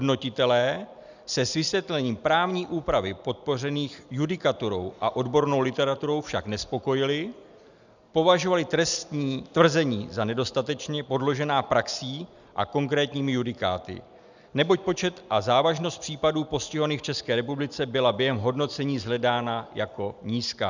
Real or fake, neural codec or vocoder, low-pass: real; none; 7.2 kHz